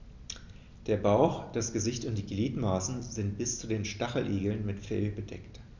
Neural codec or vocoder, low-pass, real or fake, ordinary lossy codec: none; 7.2 kHz; real; none